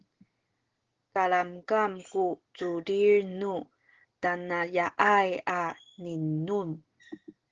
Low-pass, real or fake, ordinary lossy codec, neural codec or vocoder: 7.2 kHz; real; Opus, 16 kbps; none